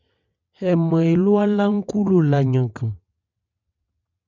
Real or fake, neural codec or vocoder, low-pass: fake; vocoder, 22.05 kHz, 80 mel bands, WaveNeXt; 7.2 kHz